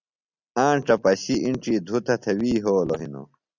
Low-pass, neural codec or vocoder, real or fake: 7.2 kHz; none; real